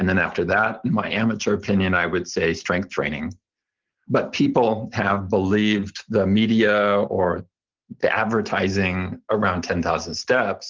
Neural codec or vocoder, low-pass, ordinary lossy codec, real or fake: none; 7.2 kHz; Opus, 16 kbps; real